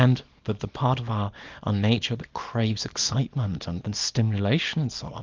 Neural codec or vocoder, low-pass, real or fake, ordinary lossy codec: codec, 24 kHz, 0.9 kbps, WavTokenizer, small release; 7.2 kHz; fake; Opus, 24 kbps